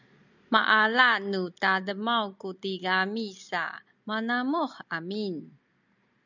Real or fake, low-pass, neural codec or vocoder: real; 7.2 kHz; none